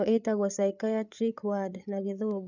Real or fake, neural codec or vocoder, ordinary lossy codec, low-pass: fake; codec, 16 kHz, 8 kbps, FreqCodec, larger model; none; 7.2 kHz